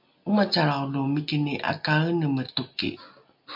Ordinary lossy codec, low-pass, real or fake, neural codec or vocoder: MP3, 48 kbps; 5.4 kHz; real; none